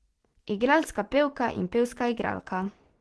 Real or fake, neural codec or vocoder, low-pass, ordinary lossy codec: real; none; 10.8 kHz; Opus, 16 kbps